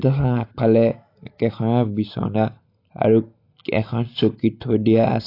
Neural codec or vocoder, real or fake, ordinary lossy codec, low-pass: none; real; AAC, 32 kbps; 5.4 kHz